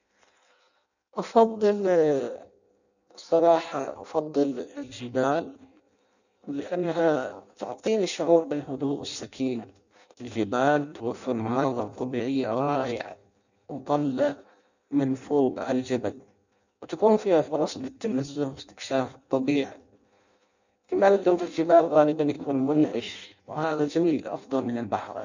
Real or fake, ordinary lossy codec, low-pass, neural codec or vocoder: fake; none; 7.2 kHz; codec, 16 kHz in and 24 kHz out, 0.6 kbps, FireRedTTS-2 codec